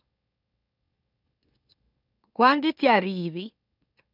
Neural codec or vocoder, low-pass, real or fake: autoencoder, 44.1 kHz, a latent of 192 numbers a frame, MeloTTS; 5.4 kHz; fake